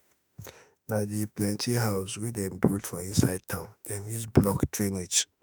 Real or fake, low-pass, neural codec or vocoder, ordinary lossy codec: fake; none; autoencoder, 48 kHz, 32 numbers a frame, DAC-VAE, trained on Japanese speech; none